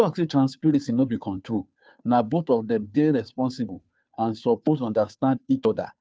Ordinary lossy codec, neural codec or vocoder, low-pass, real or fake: none; codec, 16 kHz, 2 kbps, FunCodec, trained on Chinese and English, 25 frames a second; none; fake